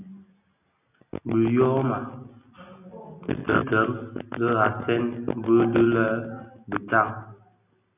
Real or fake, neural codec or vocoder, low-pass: real; none; 3.6 kHz